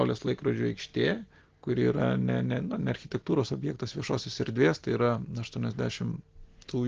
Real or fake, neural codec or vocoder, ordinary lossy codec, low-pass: real; none; Opus, 16 kbps; 7.2 kHz